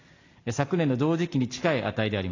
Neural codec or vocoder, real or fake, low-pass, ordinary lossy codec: none; real; 7.2 kHz; AAC, 32 kbps